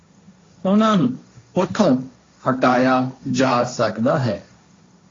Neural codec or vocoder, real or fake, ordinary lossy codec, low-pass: codec, 16 kHz, 1.1 kbps, Voila-Tokenizer; fake; AAC, 32 kbps; 7.2 kHz